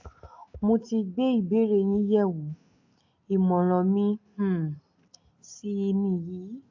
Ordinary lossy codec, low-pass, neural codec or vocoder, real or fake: AAC, 48 kbps; 7.2 kHz; none; real